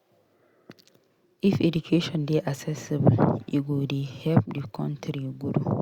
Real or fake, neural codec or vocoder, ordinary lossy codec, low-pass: real; none; Opus, 64 kbps; 19.8 kHz